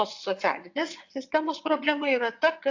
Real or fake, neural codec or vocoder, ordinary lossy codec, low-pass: fake; vocoder, 22.05 kHz, 80 mel bands, WaveNeXt; AAC, 48 kbps; 7.2 kHz